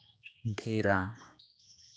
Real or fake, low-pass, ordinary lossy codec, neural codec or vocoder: fake; none; none; codec, 16 kHz, 2 kbps, X-Codec, HuBERT features, trained on general audio